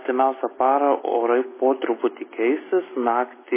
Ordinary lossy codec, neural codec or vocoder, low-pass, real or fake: MP3, 16 kbps; none; 3.6 kHz; real